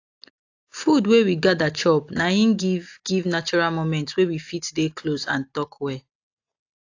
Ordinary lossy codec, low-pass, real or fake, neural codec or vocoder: AAC, 48 kbps; 7.2 kHz; real; none